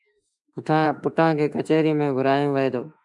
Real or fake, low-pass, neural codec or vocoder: fake; 10.8 kHz; autoencoder, 48 kHz, 32 numbers a frame, DAC-VAE, trained on Japanese speech